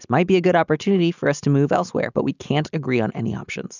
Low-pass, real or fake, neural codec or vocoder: 7.2 kHz; real; none